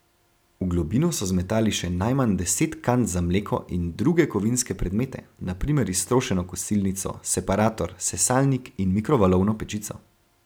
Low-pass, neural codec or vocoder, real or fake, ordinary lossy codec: none; none; real; none